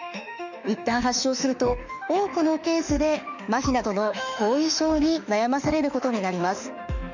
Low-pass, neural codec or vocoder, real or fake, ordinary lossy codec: 7.2 kHz; autoencoder, 48 kHz, 32 numbers a frame, DAC-VAE, trained on Japanese speech; fake; none